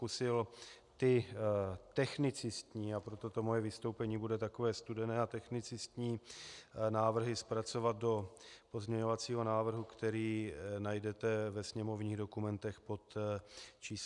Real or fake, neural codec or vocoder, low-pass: real; none; 10.8 kHz